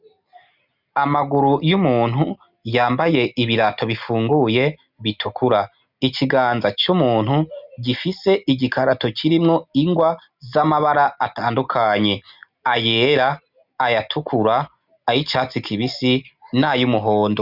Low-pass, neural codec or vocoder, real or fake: 5.4 kHz; none; real